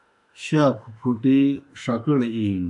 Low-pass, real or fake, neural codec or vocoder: 10.8 kHz; fake; autoencoder, 48 kHz, 32 numbers a frame, DAC-VAE, trained on Japanese speech